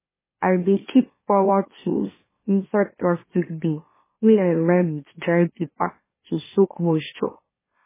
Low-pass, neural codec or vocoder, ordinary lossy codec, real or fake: 3.6 kHz; autoencoder, 44.1 kHz, a latent of 192 numbers a frame, MeloTTS; MP3, 16 kbps; fake